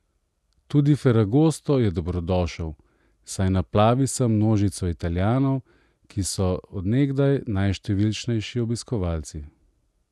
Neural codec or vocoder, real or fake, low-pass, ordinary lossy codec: none; real; none; none